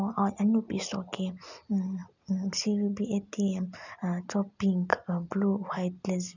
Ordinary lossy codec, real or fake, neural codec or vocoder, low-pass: none; real; none; 7.2 kHz